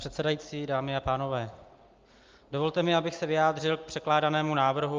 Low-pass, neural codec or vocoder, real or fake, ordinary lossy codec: 7.2 kHz; none; real; Opus, 24 kbps